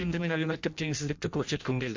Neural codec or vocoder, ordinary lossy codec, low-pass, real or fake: codec, 16 kHz in and 24 kHz out, 0.6 kbps, FireRedTTS-2 codec; MP3, 48 kbps; 7.2 kHz; fake